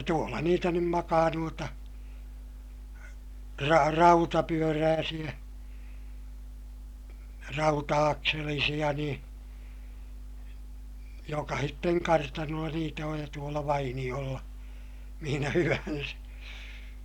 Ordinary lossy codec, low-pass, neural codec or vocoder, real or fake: none; 19.8 kHz; none; real